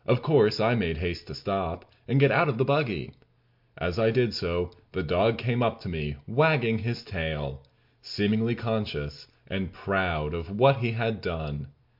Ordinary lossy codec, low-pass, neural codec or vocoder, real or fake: MP3, 48 kbps; 5.4 kHz; none; real